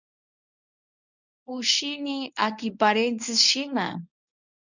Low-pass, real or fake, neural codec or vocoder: 7.2 kHz; fake; codec, 24 kHz, 0.9 kbps, WavTokenizer, medium speech release version 1